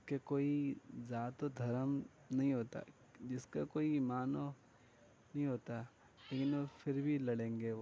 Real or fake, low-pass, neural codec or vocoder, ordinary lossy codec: real; none; none; none